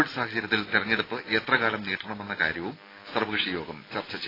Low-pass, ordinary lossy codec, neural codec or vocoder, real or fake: 5.4 kHz; AAC, 24 kbps; none; real